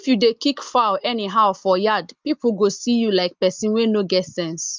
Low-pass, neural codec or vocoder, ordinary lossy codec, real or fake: 7.2 kHz; none; Opus, 24 kbps; real